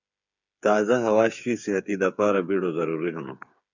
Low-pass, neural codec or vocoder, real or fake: 7.2 kHz; codec, 16 kHz, 8 kbps, FreqCodec, smaller model; fake